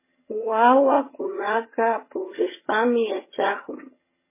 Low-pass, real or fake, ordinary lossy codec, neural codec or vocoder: 3.6 kHz; fake; MP3, 16 kbps; vocoder, 22.05 kHz, 80 mel bands, HiFi-GAN